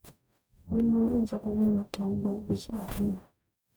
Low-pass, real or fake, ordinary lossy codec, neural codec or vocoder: none; fake; none; codec, 44.1 kHz, 0.9 kbps, DAC